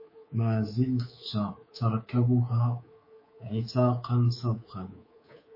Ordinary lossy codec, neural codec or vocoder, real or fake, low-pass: MP3, 24 kbps; codec, 24 kHz, 3.1 kbps, DualCodec; fake; 5.4 kHz